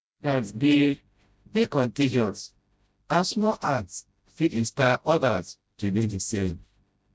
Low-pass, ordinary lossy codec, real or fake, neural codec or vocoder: none; none; fake; codec, 16 kHz, 0.5 kbps, FreqCodec, smaller model